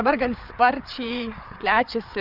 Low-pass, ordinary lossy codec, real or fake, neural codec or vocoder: 5.4 kHz; AAC, 48 kbps; fake; codec, 16 kHz, 8 kbps, FreqCodec, larger model